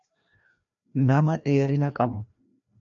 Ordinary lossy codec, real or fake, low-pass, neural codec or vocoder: MP3, 64 kbps; fake; 7.2 kHz; codec, 16 kHz, 1 kbps, FreqCodec, larger model